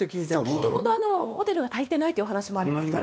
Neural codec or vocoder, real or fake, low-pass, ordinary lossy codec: codec, 16 kHz, 2 kbps, X-Codec, WavLM features, trained on Multilingual LibriSpeech; fake; none; none